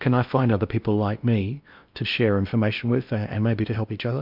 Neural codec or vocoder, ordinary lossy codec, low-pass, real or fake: codec, 16 kHz in and 24 kHz out, 0.8 kbps, FocalCodec, streaming, 65536 codes; MP3, 48 kbps; 5.4 kHz; fake